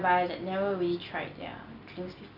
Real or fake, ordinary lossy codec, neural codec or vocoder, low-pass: real; none; none; 5.4 kHz